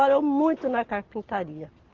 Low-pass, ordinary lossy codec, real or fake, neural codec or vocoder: 7.2 kHz; Opus, 16 kbps; real; none